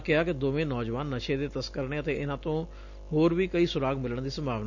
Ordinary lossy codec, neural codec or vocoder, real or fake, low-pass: none; none; real; 7.2 kHz